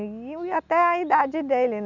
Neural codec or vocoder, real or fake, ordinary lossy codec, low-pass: none; real; none; 7.2 kHz